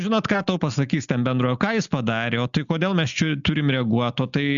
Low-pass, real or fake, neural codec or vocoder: 7.2 kHz; real; none